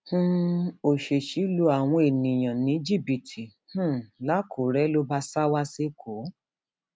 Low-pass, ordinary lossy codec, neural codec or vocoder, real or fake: none; none; none; real